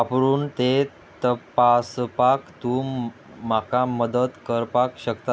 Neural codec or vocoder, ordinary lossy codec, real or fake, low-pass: none; none; real; none